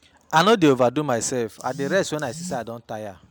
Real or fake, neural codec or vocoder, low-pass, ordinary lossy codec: real; none; none; none